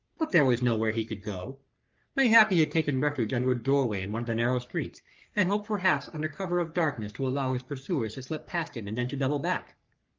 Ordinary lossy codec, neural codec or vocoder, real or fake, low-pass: Opus, 24 kbps; codec, 44.1 kHz, 3.4 kbps, Pupu-Codec; fake; 7.2 kHz